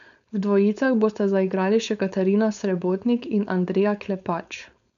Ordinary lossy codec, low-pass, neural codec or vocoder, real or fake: none; 7.2 kHz; codec, 16 kHz, 4.8 kbps, FACodec; fake